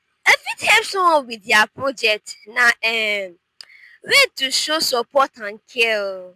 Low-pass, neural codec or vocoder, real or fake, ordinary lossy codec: 14.4 kHz; none; real; MP3, 96 kbps